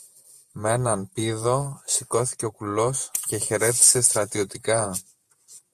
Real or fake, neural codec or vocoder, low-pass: fake; vocoder, 48 kHz, 128 mel bands, Vocos; 14.4 kHz